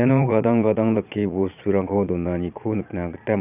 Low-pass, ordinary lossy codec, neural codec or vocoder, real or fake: 3.6 kHz; none; vocoder, 22.05 kHz, 80 mel bands, Vocos; fake